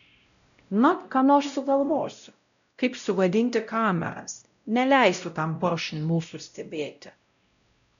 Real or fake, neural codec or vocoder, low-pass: fake; codec, 16 kHz, 0.5 kbps, X-Codec, WavLM features, trained on Multilingual LibriSpeech; 7.2 kHz